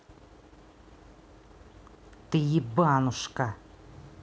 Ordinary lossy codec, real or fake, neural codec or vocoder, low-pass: none; real; none; none